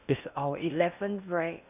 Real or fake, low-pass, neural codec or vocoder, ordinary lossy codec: fake; 3.6 kHz; codec, 16 kHz in and 24 kHz out, 0.6 kbps, FocalCodec, streaming, 2048 codes; none